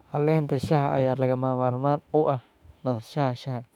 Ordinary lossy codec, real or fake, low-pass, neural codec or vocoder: none; fake; 19.8 kHz; autoencoder, 48 kHz, 32 numbers a frame, DAC-VAE, trained on Japanese speech